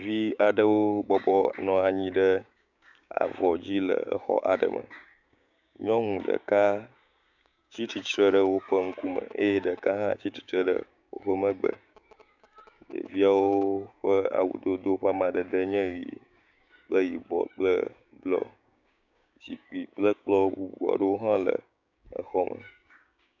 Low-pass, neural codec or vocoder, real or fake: 7.2 kHz; codec, 16 kHz, 6 kbps, DAC; fake